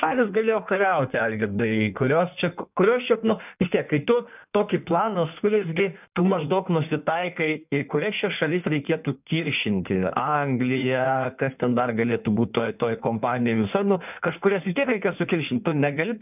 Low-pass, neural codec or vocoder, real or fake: 3.6 kHz; codec, 16 kHz in and 24 kHz out, 1.1 kbps, FireRedTTS-2 codec; fake